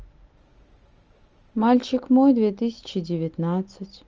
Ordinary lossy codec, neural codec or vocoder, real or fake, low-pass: Opus, 24 kbps; none; real; 7.2 kHz